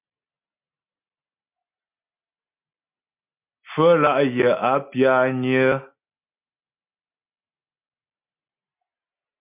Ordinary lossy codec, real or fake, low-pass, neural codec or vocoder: MP3, 32 kbps; real; 3.6 kHz; none